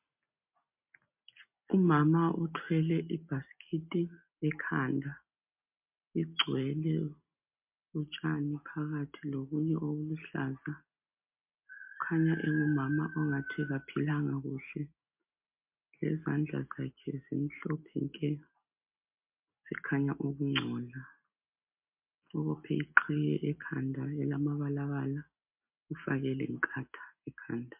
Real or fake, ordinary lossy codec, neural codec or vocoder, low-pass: real; MP3, 32 kbps; none; 3.6 kHz